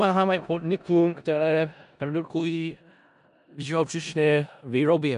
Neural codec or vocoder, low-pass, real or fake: codec, 16 kHz in and 24 kHz out, 0.4 kbps, LongCat-Audio-Codec, four codebook decoder; 10.8 kHz; fake